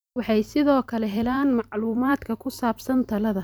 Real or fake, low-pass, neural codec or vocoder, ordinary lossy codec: fake; none; vocoder, 44.1 kHz, 128 mel bands every 256 samples, BigVGAN v2; none